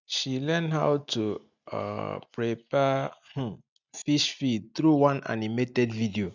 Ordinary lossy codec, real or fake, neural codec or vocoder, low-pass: none; real; none; 7.2 kHz